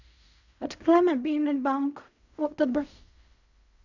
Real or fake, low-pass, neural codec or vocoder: fake; 7.2 kHz; codec, 16 kHz in and 24 kHz out, 0.4 kbps, LongCat-Audio-Codec, fine tuned four codebook decoder